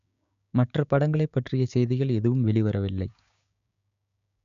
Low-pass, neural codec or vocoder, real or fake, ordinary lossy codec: 7.2 kHz; codec, 16 kHz, 6 kbps, DAC; fake; none